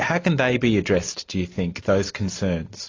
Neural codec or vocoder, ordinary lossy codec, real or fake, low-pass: none; AAC, 32 kbps; real; 7.2 kHz